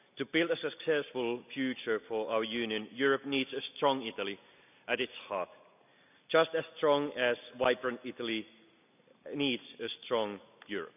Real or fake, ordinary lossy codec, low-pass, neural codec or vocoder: real; none; 3.6 kHz; none